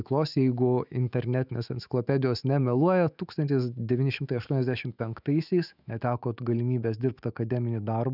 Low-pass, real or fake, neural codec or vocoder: 5.4 kHz; fake; autoencoder, 48 kHz, 128 numbers a frame, DAC-VAE, trained on Japanese speech